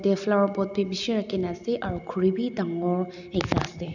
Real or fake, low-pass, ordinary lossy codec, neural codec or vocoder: real; 7.2 kHz; none; none